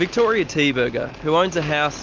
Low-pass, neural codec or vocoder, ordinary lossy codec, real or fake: 7.2 kHz; none; Opus, 24 kbps; real